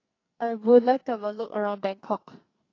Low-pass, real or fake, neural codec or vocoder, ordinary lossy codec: 7.2 kHz; fake; codec, 44.1 kHz, 2.6 kbps, SNAC; AAC, 32 kbps